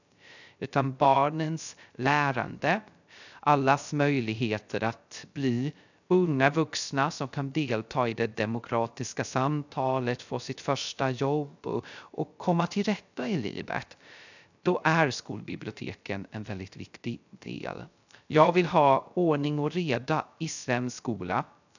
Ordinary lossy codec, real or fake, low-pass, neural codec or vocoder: none; fake; 7.2 kHz; codec, 16 kHz, 0.3 kbps, FocalCodec